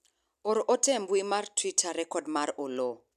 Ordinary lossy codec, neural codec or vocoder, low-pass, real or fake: none; none; 14.4 kHz; real